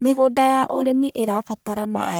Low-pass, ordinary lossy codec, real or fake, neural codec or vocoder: none; none; fake; codec, 44.1 kHz, 1.7 kbps, Pupu-Codec